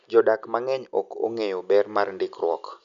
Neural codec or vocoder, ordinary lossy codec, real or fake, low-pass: none; none; real; 7.2 kHz